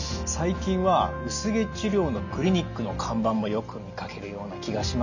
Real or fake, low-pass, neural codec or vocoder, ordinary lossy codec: real; 7.2 kHz; none; none